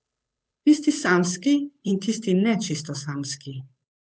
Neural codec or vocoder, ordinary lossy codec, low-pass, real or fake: codec, 16 kHz, 8 kbps, FunCodec, trained on Chinese and English, 25 frames a second; none; none; fake